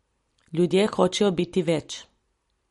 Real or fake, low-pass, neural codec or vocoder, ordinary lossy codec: real; 19.8 kHz; none; MP3, 48 kbps